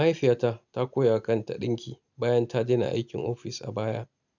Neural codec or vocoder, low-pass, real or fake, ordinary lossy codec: none; 7.2 kHz; real; none